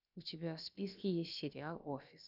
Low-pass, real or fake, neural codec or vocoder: 5.4 kHz; fake; codec, 16 kHz, about 1 kbps, DyCAST, with the encoder's durations